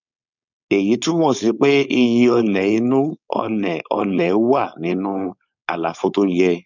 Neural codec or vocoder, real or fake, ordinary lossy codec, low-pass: codec, 16 kHz, 4.8 kbps, FACodec; fake; none; 7.2 kHz